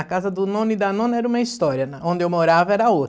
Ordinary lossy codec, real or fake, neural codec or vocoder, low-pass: none; real; none; none